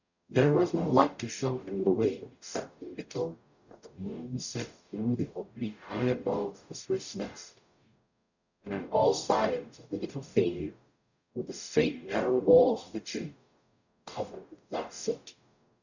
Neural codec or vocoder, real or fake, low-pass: codec, 44.1 kHz, 0.9 kbps, DAC; fake; 7.2 kHz